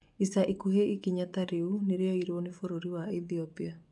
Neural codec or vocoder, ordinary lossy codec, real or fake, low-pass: vocoder, 24 kHz, 100 mel bands, Vocos; none; fake; 10.8 kHz